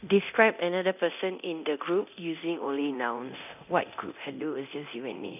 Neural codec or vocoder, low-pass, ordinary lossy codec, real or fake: codec, 24 kHz, 0.9 kbps, DualCodec; 3.6 kHz; none; fake